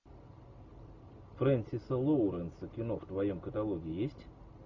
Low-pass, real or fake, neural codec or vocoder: 7.2 kHz; real; none